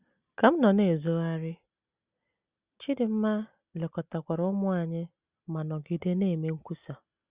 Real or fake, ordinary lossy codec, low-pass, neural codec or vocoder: real; Opus, 64 kbps; 3.6 kHz; none